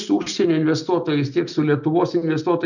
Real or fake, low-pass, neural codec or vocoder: real; 7.2 kHz; none